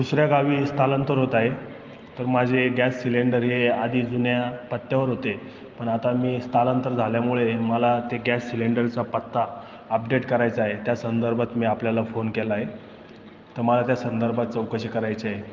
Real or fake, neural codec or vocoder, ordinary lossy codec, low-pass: real; none; Opus, 32 kbps; 7.2 kHz